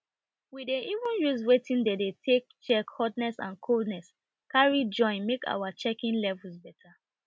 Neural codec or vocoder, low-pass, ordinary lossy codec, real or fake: none; none; none; real